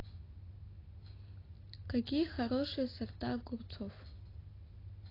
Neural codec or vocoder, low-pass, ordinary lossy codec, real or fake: none; 5.4 kHz; AAC, 24 kbps; real